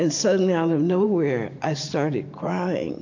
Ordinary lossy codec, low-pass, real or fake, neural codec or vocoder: AAC, 48 kbps; 7.2 kHz; fake; vocoder, 44.1 kHz, 128 mel bands every 512 samples, BigVGAN v2